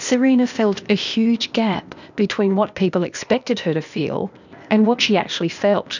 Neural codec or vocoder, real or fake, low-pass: codec, 16 kHz, 0.8 kbps, ZipCodec; fake; 7.2 kHz